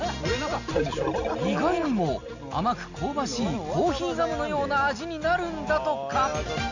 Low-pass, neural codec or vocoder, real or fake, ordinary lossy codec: 7.2 kHz; none; real; none